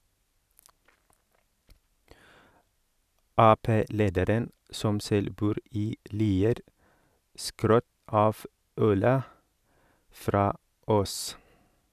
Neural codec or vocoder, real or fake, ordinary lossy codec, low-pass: none; real; none; 14.4 kHz